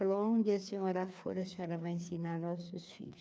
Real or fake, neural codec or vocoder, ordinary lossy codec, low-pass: fake; codec, 16 kHz, 2 kbps, FreqCodec, larger model; none; none